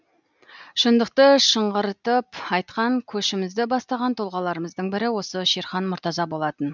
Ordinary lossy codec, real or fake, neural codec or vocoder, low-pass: none; real; none; 7.2 kHz